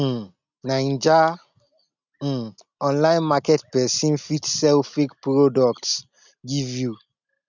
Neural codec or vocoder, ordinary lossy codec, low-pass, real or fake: none; none; 7.2 kHz; real